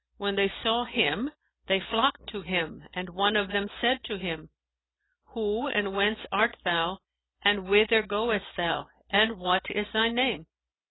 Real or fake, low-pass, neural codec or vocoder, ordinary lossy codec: real; 7.2 kHz; none; AAC, 16 kbps